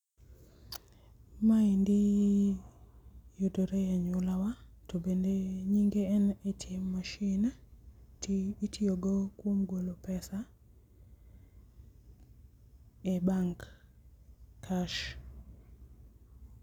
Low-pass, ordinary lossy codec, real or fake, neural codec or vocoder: 19.8 kHz; none; real; none